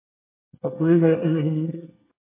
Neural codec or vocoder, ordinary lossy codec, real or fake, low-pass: codec, 24 kHz, 1 kbps, SNAC; MP3, 24 kbps; fake; 3.6 kHz